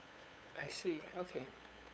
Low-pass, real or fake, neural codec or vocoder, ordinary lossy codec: none; fake; codec, 16 kHz, 8 kbps, FunCodec, trained on LibriTTS, 25 frames a second; none